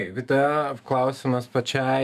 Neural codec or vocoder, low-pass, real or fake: autoencoder, 48 kHz, 128 numbers a frame, DAC-VAE, trained on Japanese speech; 14.4 kHz; fake